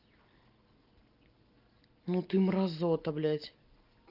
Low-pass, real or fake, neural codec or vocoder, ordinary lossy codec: 5.4 kHz; real; none; Opus, 32 kbps